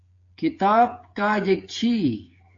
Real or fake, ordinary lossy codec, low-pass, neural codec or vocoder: fake; AAC, 48 kbps; 7.2 kHz; codec, 16 kHz, 8 kbps, FreqCodec, smaller model